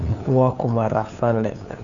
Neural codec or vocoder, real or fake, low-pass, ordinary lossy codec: codec, 16 kHz, 2 kbps, FunCodec, trained on LibriTTS, 25 frames a second; fake; 7.2 kHz; none